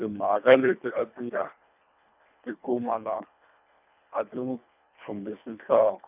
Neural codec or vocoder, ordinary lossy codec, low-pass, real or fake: codec, 24 kHz, 1.5 kbps, HILCodec; none; 3.6 kHz; fake